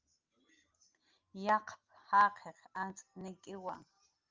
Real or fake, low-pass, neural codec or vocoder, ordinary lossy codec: real; 7.2 kHz; none; Opus, 32 kbps